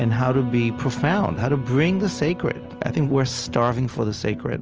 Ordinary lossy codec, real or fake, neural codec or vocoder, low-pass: Opus, 24 kbps; real; none; 7.2 kHz